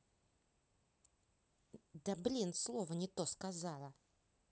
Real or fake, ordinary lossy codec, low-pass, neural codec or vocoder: real; none; none; none